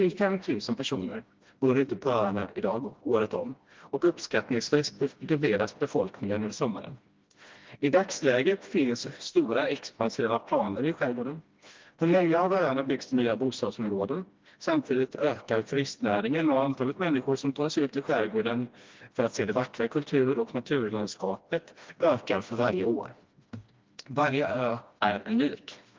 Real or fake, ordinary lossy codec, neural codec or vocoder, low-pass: fake; Opus, 32 kbps; codec, 16 kHz, 1 kbps, FreqCodec, smaller model; 7.2 kHz